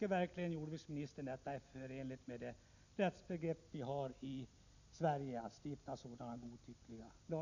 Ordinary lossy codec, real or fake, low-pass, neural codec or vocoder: none; real; 7.2 kHz; none